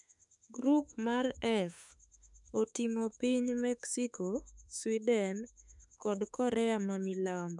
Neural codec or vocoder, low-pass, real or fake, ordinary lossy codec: autoencoder, 48 kHz, 32 numbers a frame, DAC-VAE, trained on Japanese speech; 10.8 kHz; fake; none